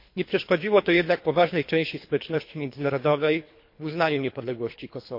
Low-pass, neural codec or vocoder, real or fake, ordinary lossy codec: 5.4 kHz; codec, 24 kHz, 3 kbps, HILCodec; fake; MP3, 32 kbps